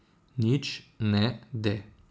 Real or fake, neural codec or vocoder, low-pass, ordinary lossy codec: real; none; none; none